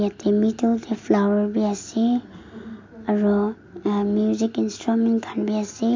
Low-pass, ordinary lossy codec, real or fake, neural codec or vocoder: 7.2 kHz; MP3, 48 kbps; real; none